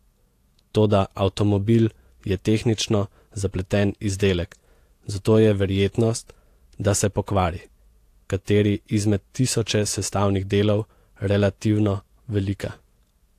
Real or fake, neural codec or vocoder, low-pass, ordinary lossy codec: fake; vocoder, 48 kHz, 128 mel bands, Vocos; 14.4 kHz; AAC, 64 kbps